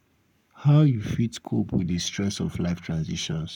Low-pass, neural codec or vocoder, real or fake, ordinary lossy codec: 19.8 kHz; codec, 44.1 kHz, 7.8 kbps, Pupu-Codec; fake; none